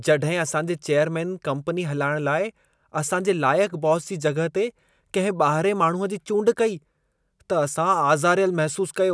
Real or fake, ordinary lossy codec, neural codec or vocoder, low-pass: real; none; none; none